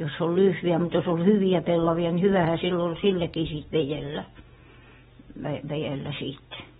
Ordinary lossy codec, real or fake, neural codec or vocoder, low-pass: AAC, 16 kbps; real; none; 19.8 kHz